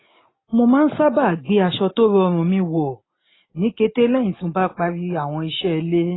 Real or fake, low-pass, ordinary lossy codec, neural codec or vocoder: real; 7.2 kHz; AAC, 16 kbps; none